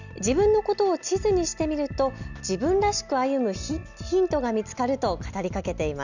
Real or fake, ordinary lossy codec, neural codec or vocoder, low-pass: real; none; none; 7.2 kHz